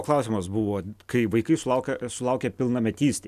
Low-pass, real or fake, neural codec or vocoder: 14.4 kHz; real; none